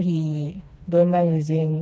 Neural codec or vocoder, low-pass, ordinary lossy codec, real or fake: codec, 16 kHz, 2 kbps, FreqCodec, smaller model; none; none; fake